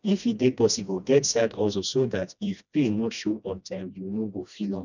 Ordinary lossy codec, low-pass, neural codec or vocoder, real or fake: none; 7.2 kHz; codec, 16 kHz, 1 kbps, FreqCodec, smaller model; fake